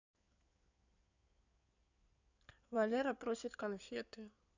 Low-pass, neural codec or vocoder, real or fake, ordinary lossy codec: 7.2 kHz; codec, 16 kHz in and 24 kHz out, 2.2 kbps, FireRedTTS-2 codec; fake; none